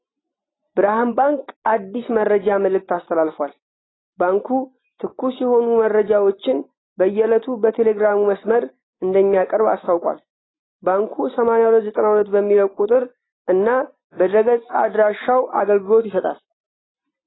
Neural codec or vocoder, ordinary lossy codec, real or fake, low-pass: none; AAC, 16 kbps; real; 7.2 kHz